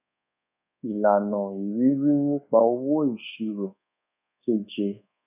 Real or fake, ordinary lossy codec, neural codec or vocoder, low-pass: fake; none; codec, 24 kHz, 1.2 kbps, DualCodec; 3.6 kHz